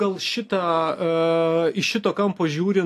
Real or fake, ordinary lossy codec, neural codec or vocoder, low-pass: real; AAC, 64 kbps; none; 14.4 kHz